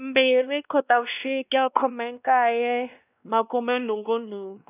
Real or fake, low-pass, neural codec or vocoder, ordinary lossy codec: fake; 3.6 kHz; codec, 16 kHz, 1 kbps, X-Codec, WavLM features, trained on Multilingual LibriSpeech; none